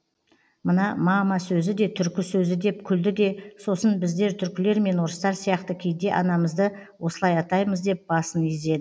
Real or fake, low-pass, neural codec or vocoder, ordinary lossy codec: real; none; none; none